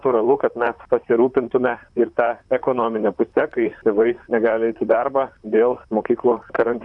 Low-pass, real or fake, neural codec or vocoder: 10.8 kHz; fake; vocoder, 44.1 kHz, 128 mel bands, Pupu-Vocoder